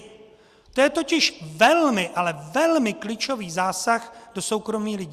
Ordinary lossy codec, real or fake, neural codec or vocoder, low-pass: Opus, 64 kbps; real; none; 14.4 kHz